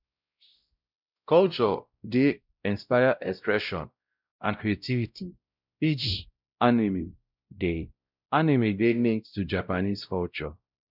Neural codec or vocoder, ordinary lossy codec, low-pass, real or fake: codec, 16 kHz, 0.5 kbps, X-Codec, WavLM features, trained on Multilingual LibriSpeech; none; 5.4 kHz; fake